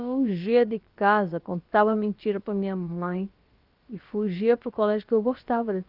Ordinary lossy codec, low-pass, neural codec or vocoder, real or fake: Opus, 32 kbps; 5.4 kHz; codec, 16 kHz, about 1 kbps, DyCAST, with the encoder's durations; fake